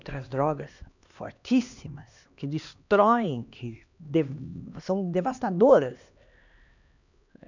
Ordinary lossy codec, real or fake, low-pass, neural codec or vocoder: none; fake; 7.2 kHz; codec, 16 kHz, 2 kbps, X-Codec, HuBERT features, trained on LibriSpeech